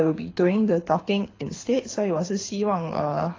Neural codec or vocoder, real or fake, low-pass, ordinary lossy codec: codec, 24 kHz, 6 kbps, HILCodec; fake; 7.2 kHz; AAC, 32 kbps